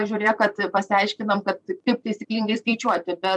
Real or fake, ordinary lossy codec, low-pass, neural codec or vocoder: fake; MP3, 96 kbps; 10.8 kHz; vocoder, 44.1 kHz, 128 mel bands every 256 samples, BigVGAN v2